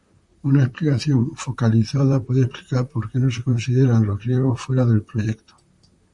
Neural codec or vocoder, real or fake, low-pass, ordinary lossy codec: vocoder, 44.1 kHz, 128 mel bands, Pupu-Vocoder; fake; 10.8 kHz; Opus, 64 kbps